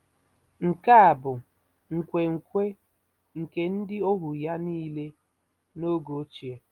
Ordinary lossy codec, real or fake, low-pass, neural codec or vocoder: Opus, 32 kbps; real; 19.8 kHz; none